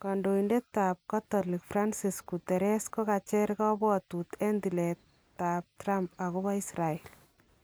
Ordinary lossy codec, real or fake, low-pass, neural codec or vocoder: none; real; none; none